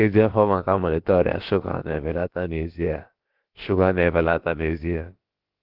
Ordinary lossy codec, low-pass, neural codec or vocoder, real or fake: Opus, 16 kbps; 5.4 kHz; codec, 16 kHz, about 1 kbps, DyCAST, with the encoder's durations; fake